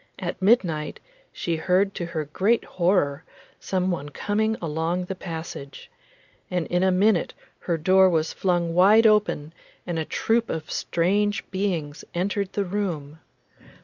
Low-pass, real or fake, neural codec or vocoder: 7.2 kHz; real; none